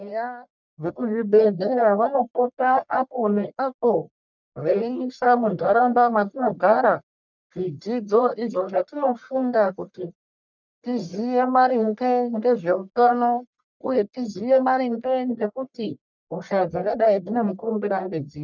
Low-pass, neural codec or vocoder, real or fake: 7.2 kHz; codec, 44.1 kHz, 1.7 kbps, Pupu-Codec; fake